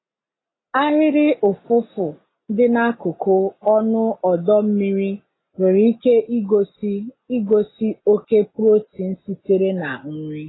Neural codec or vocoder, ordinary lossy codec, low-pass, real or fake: none; AAC, 16 kbps; 7.2 kHz; real